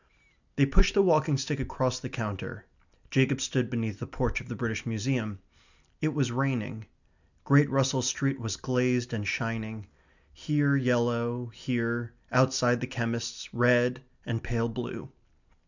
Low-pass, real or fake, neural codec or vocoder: 7.2 kHz; real; none